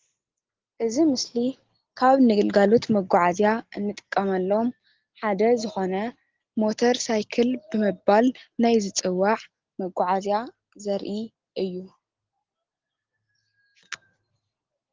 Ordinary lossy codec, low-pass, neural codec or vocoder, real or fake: Opus, 16 kbps; 7.2 kHz; none; real